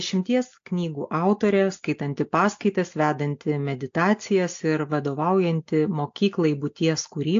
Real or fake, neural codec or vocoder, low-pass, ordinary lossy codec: real; none; 7.2 kHz; AAC, 48 kbps